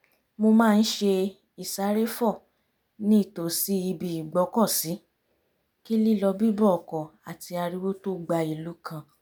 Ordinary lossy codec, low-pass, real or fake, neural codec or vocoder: none; none; real; none